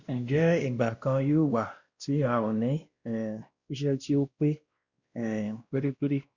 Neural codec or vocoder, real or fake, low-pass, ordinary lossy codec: codec, 16 kHz, 1 kbps, X-Codec, WavLM features, trained on Multilingual LibriSpeech; fake; 7.2 kHz; Opus, 64 kbps